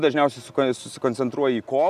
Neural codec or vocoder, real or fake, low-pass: none; real; 14.4 kHz